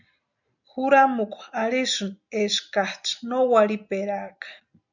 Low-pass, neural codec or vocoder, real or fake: 7.2 kHz; none; real